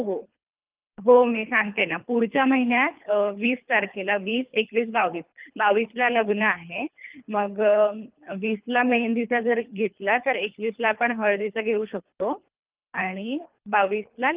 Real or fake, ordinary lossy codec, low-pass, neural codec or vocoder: fake; Opus, 32 kbps; 3.6 kHz; codec, 24 kHz, 3 kbps, HILCodec